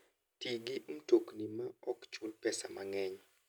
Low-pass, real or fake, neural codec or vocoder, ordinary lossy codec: none; real; none; none